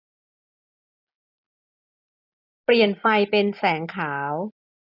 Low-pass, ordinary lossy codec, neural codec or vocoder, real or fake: 5.4 kHz; none; none; real